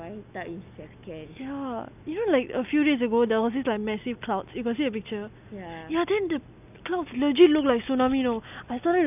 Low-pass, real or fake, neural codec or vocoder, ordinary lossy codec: 3.6 kHz; real; none; none